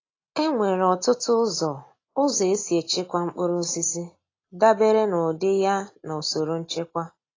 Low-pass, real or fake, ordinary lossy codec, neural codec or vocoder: 7.2 kHz; real; AAC, 32 kbps; none